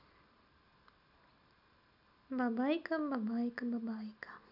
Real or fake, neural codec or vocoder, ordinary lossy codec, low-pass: real; none; none; 5.4 kHz